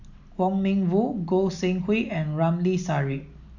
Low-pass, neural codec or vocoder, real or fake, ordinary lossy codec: 7.2 kHz; none; real; none